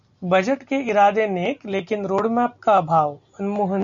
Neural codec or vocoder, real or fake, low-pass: none; real; 7.2 kHz